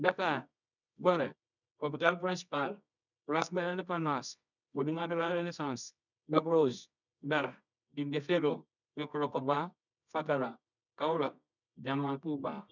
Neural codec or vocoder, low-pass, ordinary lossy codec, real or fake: codec, 24 kHz, 0.9 kbps, WavTokenizer, medium music audio release; 7.2 kHz; none; fake